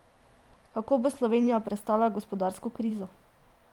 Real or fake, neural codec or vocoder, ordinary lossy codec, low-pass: fake; vocoder, 44.1 kHz, 128 mel bands every 512 samples, BigVGAN v2; Opus, 32 kbps; 19.8 kHz